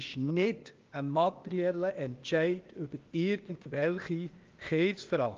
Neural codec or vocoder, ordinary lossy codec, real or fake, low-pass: codec, 16 kHz, 0.8 kbps, ZipCodec; Opus, 24 kbps; fake; 7.2 kHz